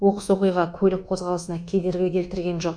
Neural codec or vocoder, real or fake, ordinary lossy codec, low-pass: codec, 24 kHz, 1.2 kbps, DualCodec; fake; MP3, 96 kbps; 9.9 kHz